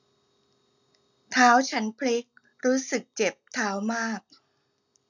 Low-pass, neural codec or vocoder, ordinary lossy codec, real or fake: 7.2 kHz; none; none; real